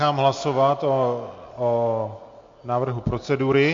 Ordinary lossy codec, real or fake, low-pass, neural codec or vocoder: MP3, 48 kbps; real; 7.2 kHz; none